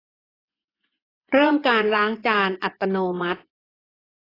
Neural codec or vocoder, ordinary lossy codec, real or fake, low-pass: vocoder, 24 kHz, 100 mel bands, Vocos; AAC, 24 kbps; fake; 5.4 kHz